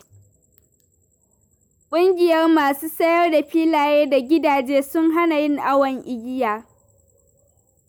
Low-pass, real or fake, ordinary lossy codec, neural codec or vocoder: none; real; none; none